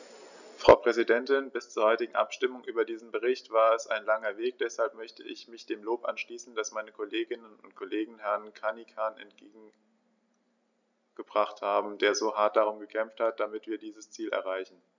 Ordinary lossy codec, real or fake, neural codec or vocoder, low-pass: none; real; none; 7.2 kHz